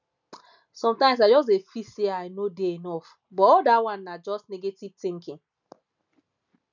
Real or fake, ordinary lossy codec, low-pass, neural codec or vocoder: real; none; 7.2 kHz; none